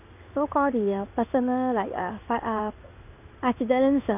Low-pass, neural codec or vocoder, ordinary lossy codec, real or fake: 3.6 kHz; codec, 16 kHz in and 24 kHz out, 1 kbps, XY-Tokenizer; none; fake